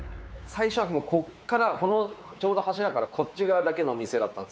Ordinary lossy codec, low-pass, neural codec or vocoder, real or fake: none; none; codec, 16 kHz, 4 kbps, X-Codec, WavLM features, trained on Multilingual LibriSpeech; fake